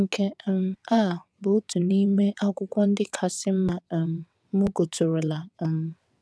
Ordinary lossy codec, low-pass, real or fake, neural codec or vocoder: none; none; fake; vocoder, 22.05 kHz, 80 mel bands, WaveNeXt